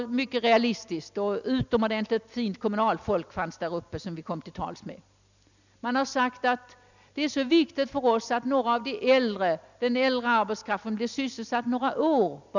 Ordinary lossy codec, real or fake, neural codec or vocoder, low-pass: none; real; none; 7.2 kHz